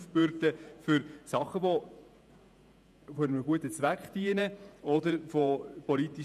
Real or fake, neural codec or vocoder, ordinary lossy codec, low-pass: fake; vocoder, 48 kHz, 128 mel bands, Vocos; none; 14.4 kHz